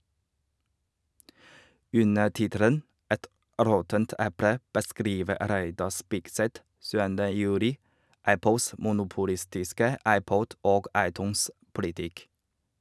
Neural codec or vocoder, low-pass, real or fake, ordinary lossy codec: none; none; real; none